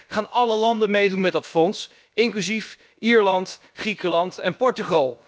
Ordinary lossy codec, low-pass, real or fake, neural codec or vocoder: none; none; fake; codec, 16 kHz, about 1 kbps, DyCAST, with the encoder's durations